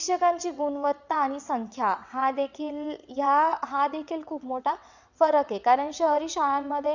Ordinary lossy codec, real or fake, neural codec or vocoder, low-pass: none; fake; vocoder, 22.05 kHz, 80 mel bands, WaveNeXt; 7.2 kHz